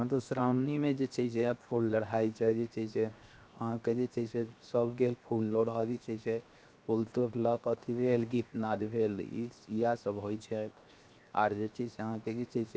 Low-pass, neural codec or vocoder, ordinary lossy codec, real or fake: none; codec, 16 kHz, 0.7 kbps, FocalCodec; none; fake